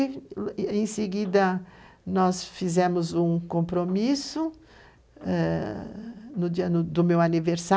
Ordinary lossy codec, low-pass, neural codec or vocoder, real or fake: none; none; none; real